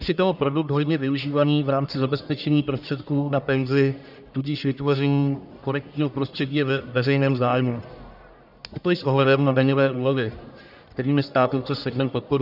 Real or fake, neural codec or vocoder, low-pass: fake; codec, 44.1 kHz, 1.7 kbps, Pupu-Codec; 5.4 kHz